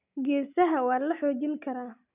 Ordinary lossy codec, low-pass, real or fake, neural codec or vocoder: none; 3.6 kHz; real; none